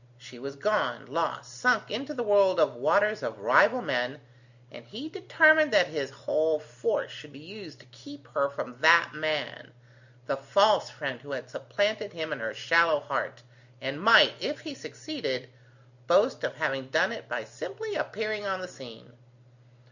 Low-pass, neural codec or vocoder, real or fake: 7.2 kHz; none; real